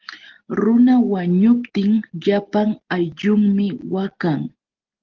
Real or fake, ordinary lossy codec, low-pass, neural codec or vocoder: real; Opus, 16 kbps; 7.2 kHz; none